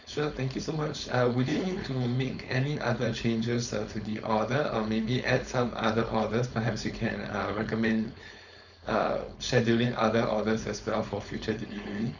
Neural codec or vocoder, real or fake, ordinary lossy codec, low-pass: codec, 16 kHz, 4.8 kbps, FACodec; fake; none; 7.2 kHz